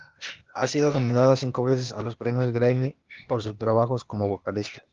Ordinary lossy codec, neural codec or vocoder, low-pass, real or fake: Opus, 32 kbps; codec, 16 kHz, 0.8 kbps, ZipCodec; 7.2 kHz; fake